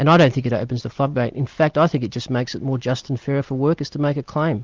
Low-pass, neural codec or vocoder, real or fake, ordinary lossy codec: 7.2 kHz; none; real; Opus, 32 kbps